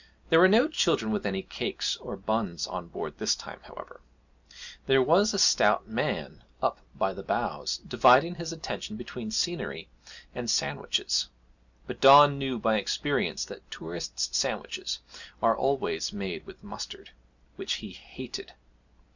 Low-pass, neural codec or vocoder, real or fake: 7.2 kHz; none; real